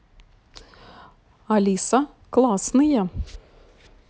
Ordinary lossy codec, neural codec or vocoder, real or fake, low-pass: none; none; real; none